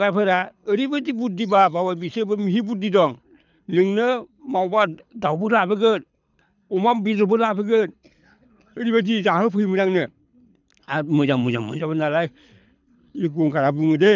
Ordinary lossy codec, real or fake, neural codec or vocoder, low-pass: none; fake; codec, 24 kHz, 6 kbps, HILCodec; 7.2 kHz